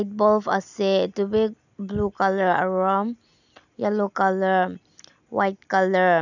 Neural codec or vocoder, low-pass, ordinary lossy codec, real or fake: none; 7.2 kHz; none; real